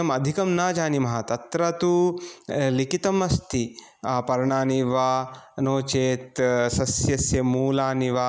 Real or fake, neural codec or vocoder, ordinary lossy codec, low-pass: real; none; none; none